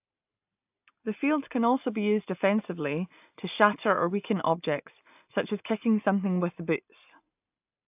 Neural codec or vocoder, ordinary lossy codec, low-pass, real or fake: none; AAC, 32 kbps; 3.6 kHz; real